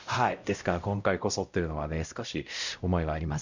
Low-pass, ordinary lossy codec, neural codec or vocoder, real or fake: 7.2 kHz; none; codec, 16 kHz, 0.5 kbps, X-Codec, WavLM features, trained on Multilingual LibriSpeech; fake